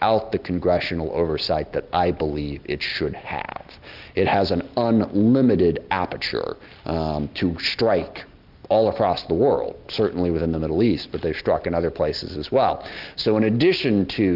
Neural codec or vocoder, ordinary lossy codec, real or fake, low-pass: none; Opus, 24 kbps; real; 5.4 kHz